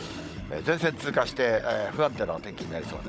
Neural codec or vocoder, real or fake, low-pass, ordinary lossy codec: codec, 16 kHz, 16 kbps, FunCodec, trained on Chinese and English, 50 frames a second; fake; none; none